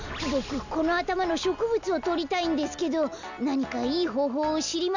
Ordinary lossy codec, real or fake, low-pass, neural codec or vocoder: none; real; 7.2 kHz; none